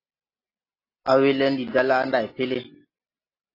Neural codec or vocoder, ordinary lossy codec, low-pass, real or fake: none; AAC, 32 kbps; 5.4 kHz; real